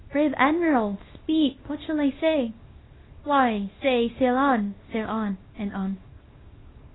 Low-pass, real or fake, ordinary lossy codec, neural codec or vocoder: 7.2 kHz; fake; AAC, 16 kbps; codec, 24 kHz, 0.9 kbps, WavTokenizer, small release